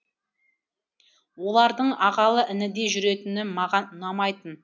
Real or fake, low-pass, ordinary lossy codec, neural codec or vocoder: real; none; none; none